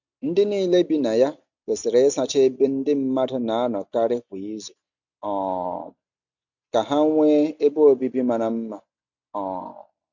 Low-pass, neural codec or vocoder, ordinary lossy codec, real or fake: 7.2 kHz; none; none; real